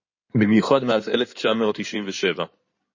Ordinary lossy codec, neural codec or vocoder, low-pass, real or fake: MP3, 32 kbps; codec, 16 kHz in and 24 kHz out, 2.2 kbps, FireRedTTS-2 codec; 7.2 kHz; fake